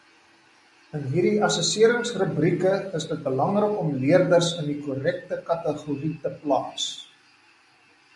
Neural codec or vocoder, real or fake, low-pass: none; real; 10.8 kHz